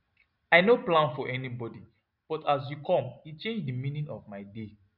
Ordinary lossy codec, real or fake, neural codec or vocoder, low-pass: none; real; none; 5.4 kHz